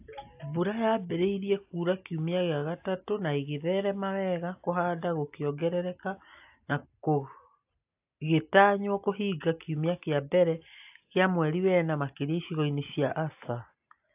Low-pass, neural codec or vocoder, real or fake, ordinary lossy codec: 3.6 kHz; none; real; AAC, 32 kbps